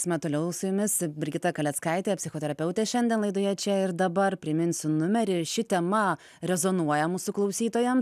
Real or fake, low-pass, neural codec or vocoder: real; 14.4 kHz; none